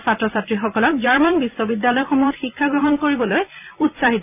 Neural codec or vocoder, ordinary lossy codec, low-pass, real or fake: vocoder, 44.1 kHz, 128 mel bands every 256 samples, BigVGAN v2; AAC, 32 kbps; 3.6 kHz; fake